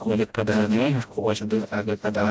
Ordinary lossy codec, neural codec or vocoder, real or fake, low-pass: none; codec, 16 kHz, 0.5 kbps, FreqCodec, smaller model; fake; none